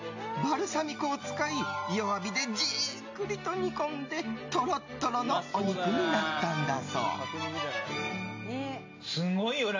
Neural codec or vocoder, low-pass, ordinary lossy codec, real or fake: none; 7.2 kHz; AAC, 48 kbps; real